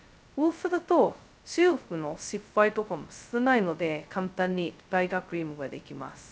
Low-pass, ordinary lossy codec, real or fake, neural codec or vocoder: none; none; fake; codec, 16 kHz, 0.2 kbps, FocalCodec